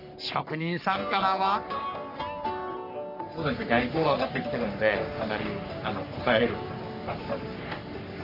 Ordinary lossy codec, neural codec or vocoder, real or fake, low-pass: none; codec, 44.1 kHz, 3.4 kbps, Pupu-Codec; fake; 5.4 kHz